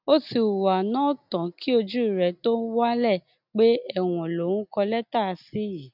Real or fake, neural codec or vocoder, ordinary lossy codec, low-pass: real; none; none; 5.4 kHz